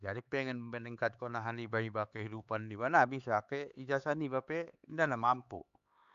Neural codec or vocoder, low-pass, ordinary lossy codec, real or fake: codec, 16 kHz, 4 kbps, X-Codec, HuBERT features, trained on LibriSpeech; 7.2 kHz; Opus, 64 kbps; fake